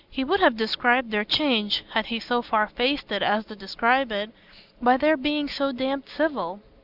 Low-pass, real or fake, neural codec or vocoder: 5.4 kHz; real; none